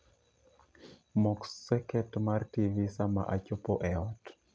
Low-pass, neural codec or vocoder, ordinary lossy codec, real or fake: none; none; none; real